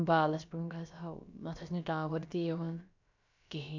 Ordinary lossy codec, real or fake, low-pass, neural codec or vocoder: none; fake; 7.2 kHz; codec, 16 kHz, about 1 kbps, DyCAST, with the encoder's durations